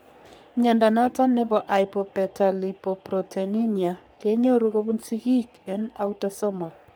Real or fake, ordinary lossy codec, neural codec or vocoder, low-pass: fake; none; codec, 44.1 kHz, 3.4 kbps, Pupu-Codec; none